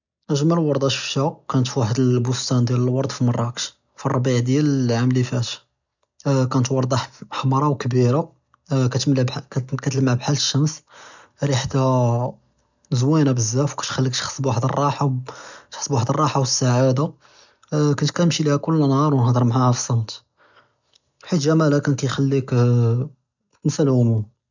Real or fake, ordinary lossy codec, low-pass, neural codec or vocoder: real; none; 7.2 kHz; none